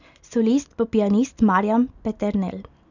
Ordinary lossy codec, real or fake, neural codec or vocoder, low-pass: none; real; none; 7.2 kHz